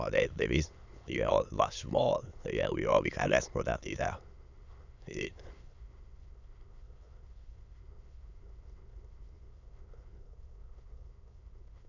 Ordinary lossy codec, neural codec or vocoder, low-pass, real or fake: none; autoencoder, 22.05 kHz, a latent of 192 numbers a frame, VITS, trained on many speakers; 7.2 kHz; fake